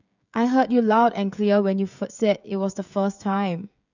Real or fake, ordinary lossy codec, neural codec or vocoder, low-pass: fake; none; codec, 16 kHz, 16 kbps, FreqCodec, smaller model; 7.2 kHz